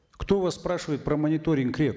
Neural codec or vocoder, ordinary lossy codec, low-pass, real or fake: none; none; none; real